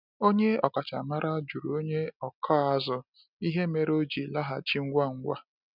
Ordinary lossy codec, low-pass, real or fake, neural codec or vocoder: none; 5.4 kHz; real; none